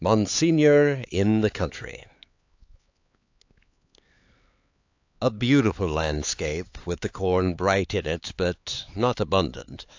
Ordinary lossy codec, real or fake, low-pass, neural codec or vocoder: AAC, 48 kbps; fake; 7.2 kHz; codec, 16 kHz, 4 kbps, X-Codec, HuBERT features, trained on LibriSpeech